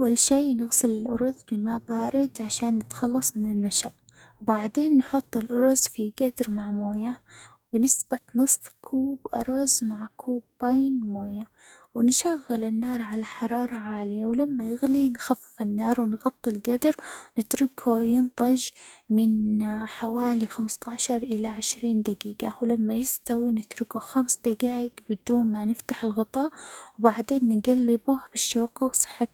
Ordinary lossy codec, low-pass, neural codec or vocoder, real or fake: none; 19.8 kHz; codec, 44.1 kHz, 2.6 kbps, DAC; fake